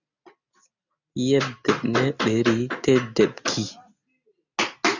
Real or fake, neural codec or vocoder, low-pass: real; none; 7.2 kHz